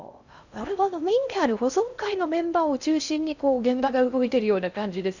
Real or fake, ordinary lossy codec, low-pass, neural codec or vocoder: fake; none; 7.2 kHz; codec, 16 kHz in and 24 kHz out, 0.6 kbps, FocalCodec, streaming, 4096 codes